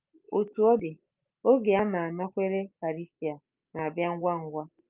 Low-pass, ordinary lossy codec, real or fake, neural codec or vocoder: 3.6 kHz; Opus, 24 kbps; real; none